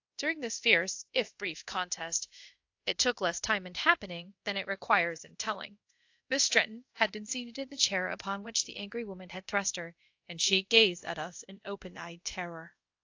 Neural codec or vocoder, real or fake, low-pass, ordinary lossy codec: codec, 24 kHz, 0.5 kbps, DualCodec; fake; 7.2 kHz; AAC, 48 kbps